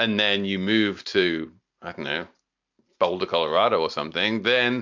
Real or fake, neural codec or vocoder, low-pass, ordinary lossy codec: real; none; 7.2 kHz; MP3, 64 kbps